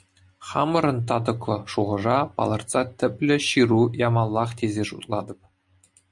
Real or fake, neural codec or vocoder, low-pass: real; none; 10.8 kHz